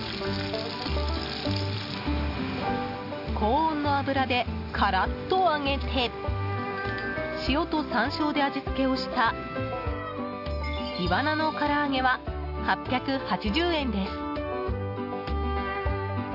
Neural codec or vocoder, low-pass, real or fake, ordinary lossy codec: none; 5.4 kHz; real; AAC, 48 kbps